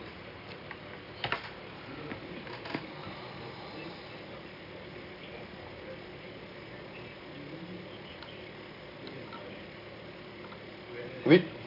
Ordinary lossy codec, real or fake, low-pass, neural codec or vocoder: AAC, 48 kbps; real; 5.4 kHz; none